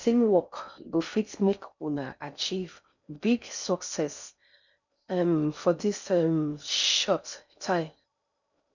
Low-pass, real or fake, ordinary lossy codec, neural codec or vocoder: 7.2 kHz; fake; none; codec, 16 kHz in and 24 kHz out, 0.6 kbps, FocalCodec, streaming, 4096 codes